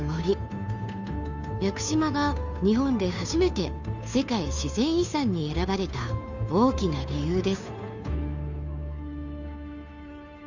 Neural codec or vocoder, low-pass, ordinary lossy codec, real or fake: codec, 16 kHz, 2 kbps, FunCodec, trained on Chinese and English, 25 frames a second; 7.2 kHz; none; fake